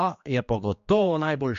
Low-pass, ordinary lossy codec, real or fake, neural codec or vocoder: 7.2 kHz; MP3, 48 kbps; fake; codec, 16 kHz, 2 kbps, X-Codec, HuBERT features, trained on general audio